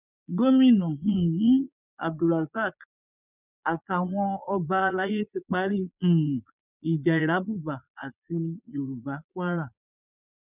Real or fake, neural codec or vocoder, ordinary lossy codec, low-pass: fake; vocoder, 22.05 kHz, 80 mel bands, Vocos; none; 3.6 kHz